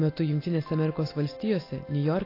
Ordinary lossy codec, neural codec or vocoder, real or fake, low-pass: AAC, 24 kbps; none; real; 5.4 kHz